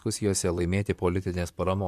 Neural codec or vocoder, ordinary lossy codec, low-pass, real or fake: vocoder, 44.1 kHz, 128 mel bands, Pupu-Vocoder; MP3, 96 kbps; 14.4 kHz; fake